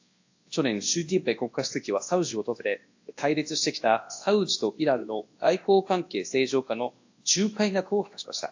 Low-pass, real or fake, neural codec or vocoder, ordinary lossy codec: 7.2 kHz; fake; codec, 24 kHz, 0.9 kbps, WavTokenizer, large speech release; AAC, 48 kbps